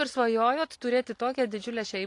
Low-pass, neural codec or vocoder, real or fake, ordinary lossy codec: 10.8 kHz; vocoder, 44.1 kHz, 128 mel bands every 512 samples, BigVGAN v2; fake; AAC, 48 kbps